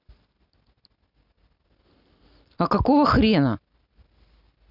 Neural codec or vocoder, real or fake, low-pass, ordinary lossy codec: none; real; 5.4 kHz; none